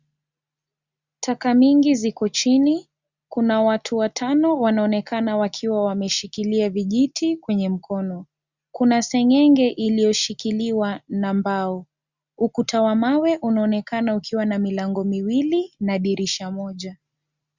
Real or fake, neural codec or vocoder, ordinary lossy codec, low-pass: real; none; Opus, 64 kbps; 7.2 kHz